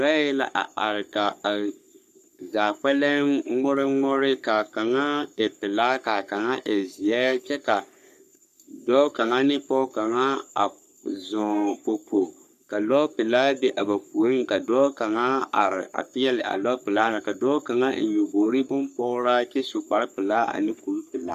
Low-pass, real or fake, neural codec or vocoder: 14.4 kHz; fake; codec, 44.1 kHz, 3.4 kbps, Pupu-Codec